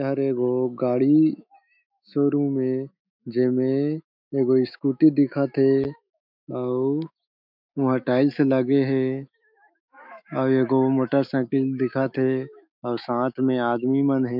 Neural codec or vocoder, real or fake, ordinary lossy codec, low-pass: autoencoder, 48 kHz, 128 numbers a frame, DAC-VAE, trained on Japanese speech; fake; MP3, 48 kbps; 5.4 kHz